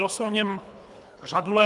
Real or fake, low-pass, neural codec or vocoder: fake; 10.8 kHz; codec, 24 kHz, 3 kbps, HILCodec